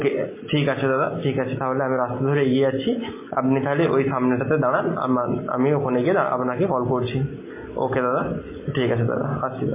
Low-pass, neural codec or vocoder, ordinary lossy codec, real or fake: 3.6 kHz; none; MP3, 16 kbps; real